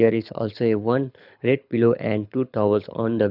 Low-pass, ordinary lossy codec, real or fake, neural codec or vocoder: 5.4 kHz; none; fake; codec, 24 kHz, 6 kbps, HILCodec